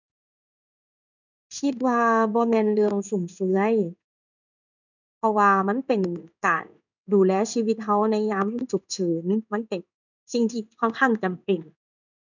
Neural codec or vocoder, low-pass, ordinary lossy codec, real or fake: codec, 16 kHz in and 24 kHz out, 1 kbps, XY-Tokenizer; 7.2 kHz; none; fake